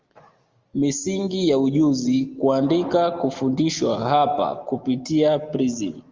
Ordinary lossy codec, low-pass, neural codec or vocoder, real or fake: Opus, 32 kbps; 7.2 kHz; none; real